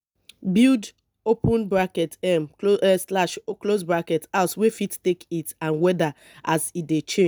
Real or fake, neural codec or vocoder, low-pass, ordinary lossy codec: real; none; none; none